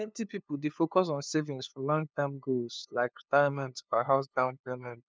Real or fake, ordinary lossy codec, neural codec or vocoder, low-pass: fake; none; codec, 16 kHz, 2 kbps, FunCodec, trained on LibriTTS, 25 frames a second; none